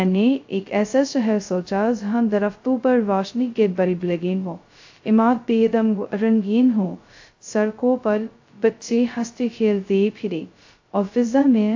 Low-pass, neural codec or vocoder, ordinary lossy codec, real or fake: 7.2 kHz; codec, 16 kHz, 0.2 kbps, FocalCodec; AAC, 48 kbps; fake